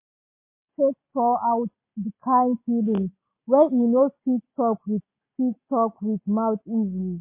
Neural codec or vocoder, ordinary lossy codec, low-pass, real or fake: none; MP3, 24 kbps; 3.6 kHz; real